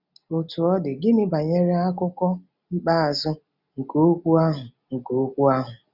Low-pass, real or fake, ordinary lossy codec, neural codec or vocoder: 5.4 kHz; real; none; none